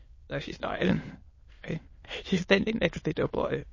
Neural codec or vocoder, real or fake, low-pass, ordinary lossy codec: autoencoder, 22.05 kHz, a latent of 192 numbers a frame, VITS, trained on many speakers; fake; 7.2 kHz; MP3, 32 kbps